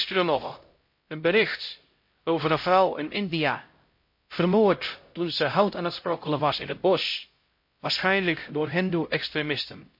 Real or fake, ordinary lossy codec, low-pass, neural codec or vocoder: fake; MP3, 32 kbps; 5.4 kHz; codec, 16 kHz, 0.5 kbps, X-Codec, HuBERT features, trained on LibriSpeech